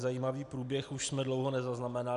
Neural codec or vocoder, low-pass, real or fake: none; 10.8 kHz; real